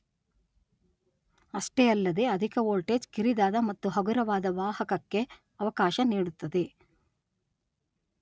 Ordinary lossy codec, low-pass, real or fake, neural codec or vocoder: none; none; real; none